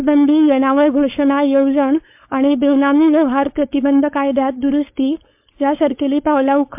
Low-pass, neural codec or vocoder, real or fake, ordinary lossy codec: 3.6 kHz; codec, 16 kHz, 4.8 kbps, FACodec; fake; MP3, 32 kbps